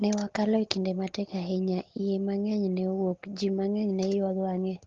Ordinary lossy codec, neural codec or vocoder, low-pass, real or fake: Opus, 16 kbps; none; 7.2 kHz; real